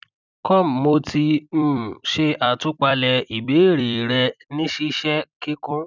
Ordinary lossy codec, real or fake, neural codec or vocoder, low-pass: none; fake; vocoder, 44.1 kHz, 128 mel bands every 256 samples, BigVGAN v2; 7.2 kHz